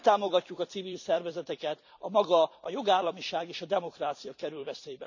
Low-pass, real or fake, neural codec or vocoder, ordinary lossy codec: 7.2 kHz; fake; vocoder, 44.1 kHz, 128 mel bands every 256 samples, BigVGAN v2; none